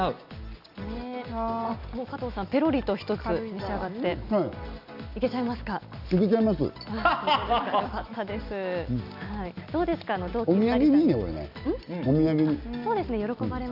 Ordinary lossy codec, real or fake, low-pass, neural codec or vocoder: none; real; 5.4 kHz; none